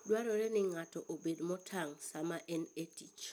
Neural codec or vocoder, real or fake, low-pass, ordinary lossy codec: none; real; none; none